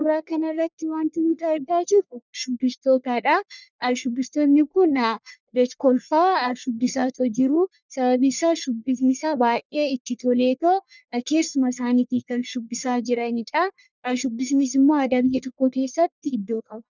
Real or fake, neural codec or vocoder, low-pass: fake; codec, 44.1 kHz, 1.7 kbps, Pupu-Codec; 7.2 kHz